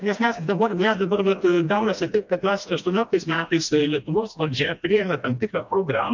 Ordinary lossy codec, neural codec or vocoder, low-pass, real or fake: MP3, 64 kbps; codec, 16 kHz, 1 kbps, FreqCodec, smaller model; 7.2 kHz; fake